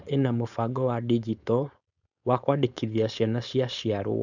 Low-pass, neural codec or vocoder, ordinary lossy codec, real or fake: 7.2 kHz; codec, 16 kHz, 4.8 kbps, FACodec; none; fake